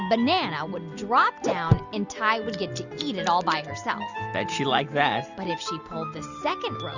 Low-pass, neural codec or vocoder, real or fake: 7.2 kHz; none; real